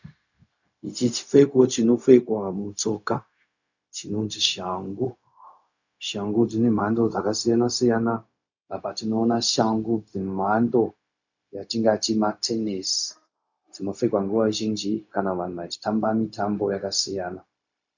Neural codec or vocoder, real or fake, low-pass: codec, 16 kHz, 0.4 kbps, LongCat-Audio-Codec; fake; 7.2 kHz